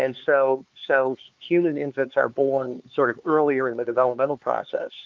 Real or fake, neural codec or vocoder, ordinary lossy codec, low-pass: fake; codec, 16 kHz, 4 kbps, X-Codec, HuBERT features, trained on LibriSpeech; Opus, 24 kbps; 7.2 kHz